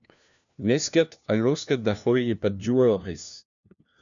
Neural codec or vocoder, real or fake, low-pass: codec, 16 kHz, 1 kbps, FunCodec, trained on LibriTTS, 50 frames a second; fake; 7.2 kHz